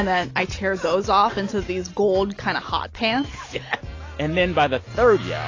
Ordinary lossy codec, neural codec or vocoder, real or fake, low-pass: AAC, 32 kbps; none; real; 7.2 kHz